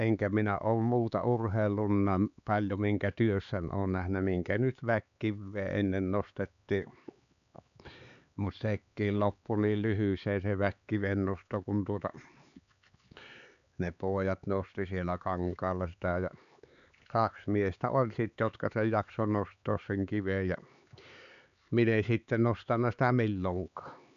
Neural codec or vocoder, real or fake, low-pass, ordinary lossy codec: codec, 16 kHz, 4 kbps, X-Codec, HuBERT features, trained on LibriSpeech; fake; 7.2 kHz; none